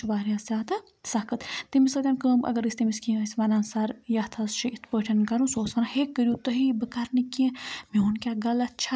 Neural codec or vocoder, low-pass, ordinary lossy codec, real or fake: none; none; none; real